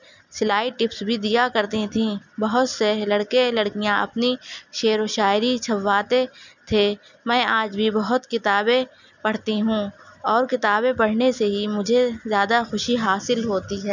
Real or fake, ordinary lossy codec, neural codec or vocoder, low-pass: real; none; none; 7.2 kHz